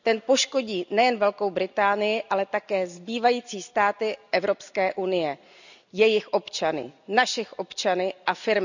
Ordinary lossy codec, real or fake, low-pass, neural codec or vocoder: none; real; 7.2 kHz; none